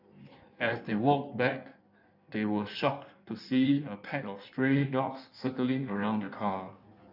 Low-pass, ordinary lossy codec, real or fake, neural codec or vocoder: 5.4 kHz; none; fake; codec, 16 kHz in and 24 kHz out, 1.1 kbps, FireRedTTS-2 codec